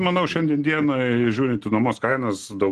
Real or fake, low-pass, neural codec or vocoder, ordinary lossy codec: real; 10.8 kHz; none; Opus, 16 kbps